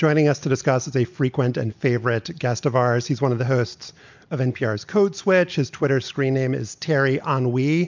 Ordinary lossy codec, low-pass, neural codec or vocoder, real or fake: MP3, 64 kbps; 7.2 kHz; none; real